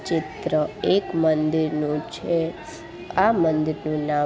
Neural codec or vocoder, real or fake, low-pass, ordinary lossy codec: none; real; none; none